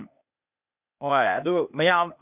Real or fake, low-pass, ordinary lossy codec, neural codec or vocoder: fake; 3.6 kHz; none; codec, 16 kHz, 0.8 kbps, ZipCodec